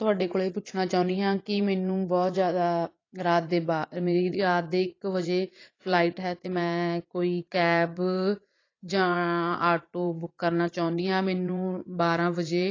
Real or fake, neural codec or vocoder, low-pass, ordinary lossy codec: fake; vocoder, 44.1 kHz, 80 mel bands, Vocos; 7.2 kHz; AAC, 32 kbps